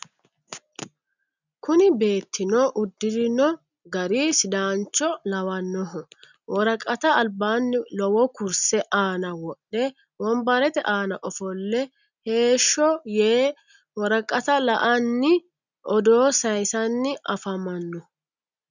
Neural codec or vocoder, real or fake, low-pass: none; real; 7.2 kHz